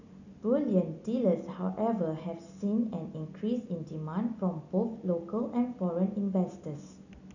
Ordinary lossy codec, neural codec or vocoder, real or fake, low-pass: none; none; real; 7.2 kHz